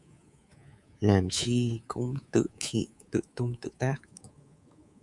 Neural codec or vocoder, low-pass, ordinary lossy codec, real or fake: codec, 24 kHz, 3.1 kbps, DualCodec; 10.8 kHz; Opus, 64 kbps; fake